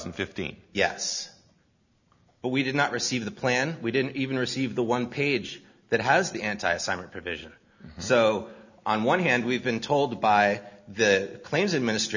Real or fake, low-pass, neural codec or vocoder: real; 7.2 kHz; none